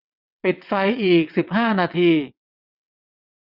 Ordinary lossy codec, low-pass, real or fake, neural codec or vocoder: none; 5.4 kHz; fake; vocoder, 22.05 kHz, 80 mel bands, WaveNeXt